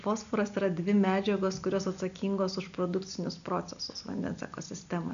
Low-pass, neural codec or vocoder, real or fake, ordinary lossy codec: 7.2 kHz; none; real; AAC, 96 kbps